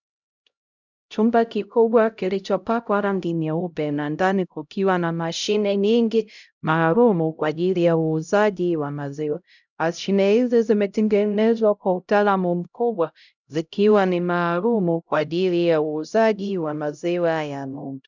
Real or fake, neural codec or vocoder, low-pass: fake; codec, 16 kHz, 0.5 kbps, X-Codec, HuBERT features, trained on LibriSpeech; 7.2 kHz